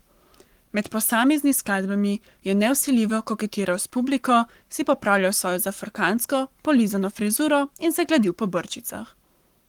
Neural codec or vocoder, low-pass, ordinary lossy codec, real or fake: codec, 44.1 kHz, 7.8 kbps, Pupu-Codec; 19.8 kHz; Opus, 24 kbps; fake